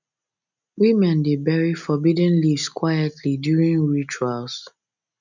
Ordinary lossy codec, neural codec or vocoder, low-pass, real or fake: none; none; 7.2 kHz; real